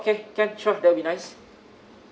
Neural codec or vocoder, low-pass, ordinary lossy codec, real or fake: none; none; none; real